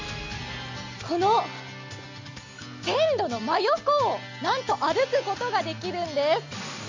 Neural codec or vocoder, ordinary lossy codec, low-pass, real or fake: none; MP3, 64 kbps; 7.2 kHz; real